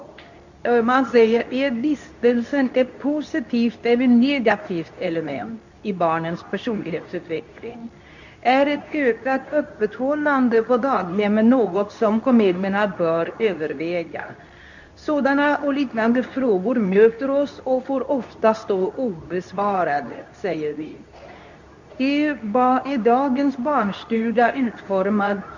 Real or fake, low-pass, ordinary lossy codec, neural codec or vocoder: fake; 7.2 kHz; none; codec, 24 kHz, 0.9 kbps, WavTokenizer, medium speech release version 2